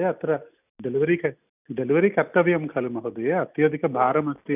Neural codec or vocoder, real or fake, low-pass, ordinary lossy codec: none; real; 3.6 kHz; none